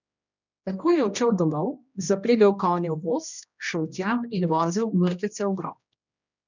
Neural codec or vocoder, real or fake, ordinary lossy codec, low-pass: codec, 16 kHz, 1 kbps, X-Codec, HuBERT features, trained on general audio; fake; none; 7.2 kHz